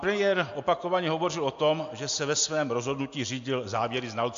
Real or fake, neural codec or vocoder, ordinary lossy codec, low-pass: real; none; AAC, 64 kbps; 7.2 kHz